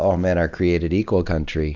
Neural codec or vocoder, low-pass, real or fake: none; 7.2 kHz; real